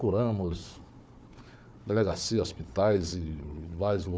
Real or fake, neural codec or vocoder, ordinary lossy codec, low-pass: fake; codec, 16 kHz, 4 kbps, FunCodec, trained on Chinese and English, 50 frames a second; none; none